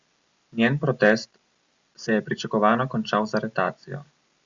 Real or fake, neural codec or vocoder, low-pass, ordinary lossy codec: real; none; 7.2 kHz; Opus, 64 kbps